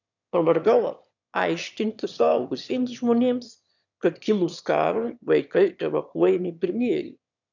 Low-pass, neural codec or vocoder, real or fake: 7.2 kHz; autoencoder, 22.05 kHz, a latent of 192 numbers a frame, VITS, trained on one speaker; fake